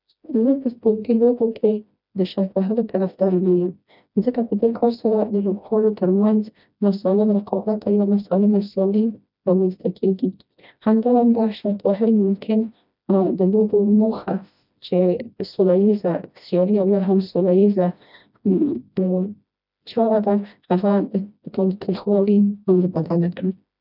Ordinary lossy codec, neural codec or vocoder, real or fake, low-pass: none; codec, 16 kHz, 1 kbps, FreqCodec, smaller model; fake; 5.4 kHz